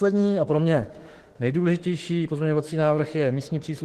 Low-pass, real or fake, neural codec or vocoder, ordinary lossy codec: 14.4 kHz; fake; autoencoder, 48 kHz, 32 numbers a frame, DAC-VAE, trained on Japanese speech; Opus, 16 kbps